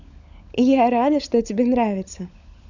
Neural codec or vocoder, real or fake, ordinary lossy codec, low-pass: codec, 16 kHz, 16 kbps, FunCodec, trained on LibriTTS, 50 frames a second; fake; none; 7.2 kHz